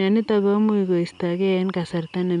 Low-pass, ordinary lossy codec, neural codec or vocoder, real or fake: 10.8 kHz; none; none; real